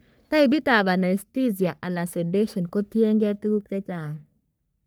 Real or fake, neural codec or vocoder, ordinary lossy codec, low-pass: fake; codec, 44.1 kHz, 3.4 kbps, Pupu-Codec; none; none